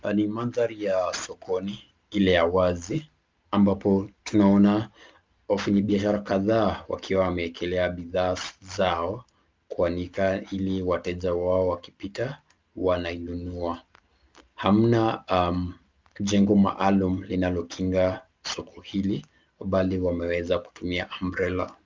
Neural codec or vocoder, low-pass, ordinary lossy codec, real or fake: none; 7.2 kHz; Opus, 24 kbps; real